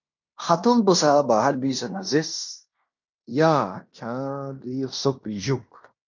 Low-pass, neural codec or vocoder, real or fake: 7.2 kHz; codec, 16 kHz in and 24 kHz out, 0.9 kbps, LongCat-Audio-Codec, fine tuned four codebook decoder; fake